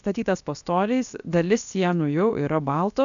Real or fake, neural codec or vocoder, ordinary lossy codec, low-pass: fake; codec, 16 kHz, 0.7 kbps, FocalCodec; MP3, 96 kbps; 7.2 kHz